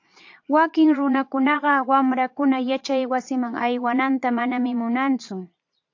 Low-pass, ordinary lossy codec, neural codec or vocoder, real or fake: 7.2 kHz; AAC, 48 kbps; vocoder, 22.05 kHz, 80 mel bands, Vocos; fake